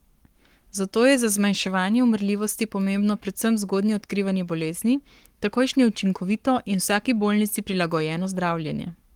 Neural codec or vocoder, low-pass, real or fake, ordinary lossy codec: codec, 44.1 kHz, 7.8 kbps, Pupu-Codec; 19.8 kHz; fake; Opus, 24 kbps